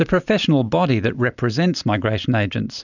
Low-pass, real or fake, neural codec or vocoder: 7.2 kHz; real; none